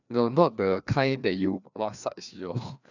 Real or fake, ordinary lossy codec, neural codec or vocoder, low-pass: fake; none; codec, 16 kHz, 2 kbps, FreqCodec, larger model; 7.2 kHz